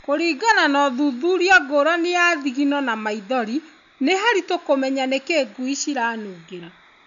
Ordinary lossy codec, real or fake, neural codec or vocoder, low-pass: none; real; none; 7.2 kHz